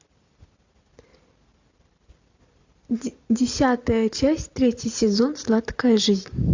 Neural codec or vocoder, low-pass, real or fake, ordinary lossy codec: vocoder, 44.1 kHz, 128 mel bands every 256 samples, BigVGAN v2; 7.2 kHz; fake; AAC, 48 kbps